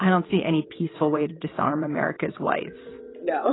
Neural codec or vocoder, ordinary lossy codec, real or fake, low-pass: vocoder, 22.05 kHz, 80 mel bands, WaveNeXt; AAC, 16 kbps; fake; 7.2 kHz